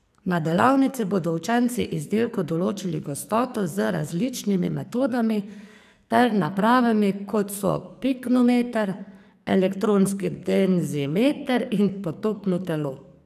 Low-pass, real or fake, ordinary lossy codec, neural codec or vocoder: 14.4 kHz; fake; none; codec, 44.1 kHz, 2.6 kbps, SNAC